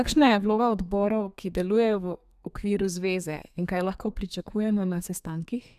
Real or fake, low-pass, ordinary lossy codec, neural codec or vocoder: fake; 14.4 kHz; none; codec, 32 kHz, 1.9 kbps, SNAC